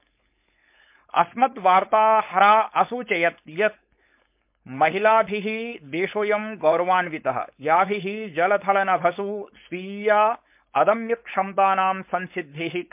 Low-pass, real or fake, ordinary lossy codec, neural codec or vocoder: 3.6 kHz; fake; MP3, 32 kbps; codec, 16 kHz, 4.8 kbps, FACodec